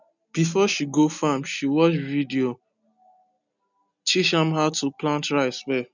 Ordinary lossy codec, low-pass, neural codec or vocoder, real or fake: none; 7.2 kHz; none; real